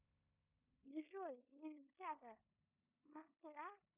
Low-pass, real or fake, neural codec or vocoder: 3.6 kHz; fake; codec, 16 kHz in and 24 kHz out, 0.9 kbps, LongCat-Audio-Codec, four codebook decoder